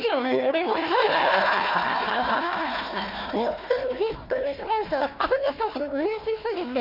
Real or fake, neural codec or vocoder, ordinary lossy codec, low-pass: fake; codec, 16 kHz, 1 kbps, FunCodec, trained on Chinese and English, 50 frames a second; none; 5.4 kHz